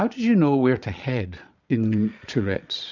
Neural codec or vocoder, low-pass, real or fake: vocoder, 22.05 kHz, 80 mel bands, Vocos; 7.2 kHz; fake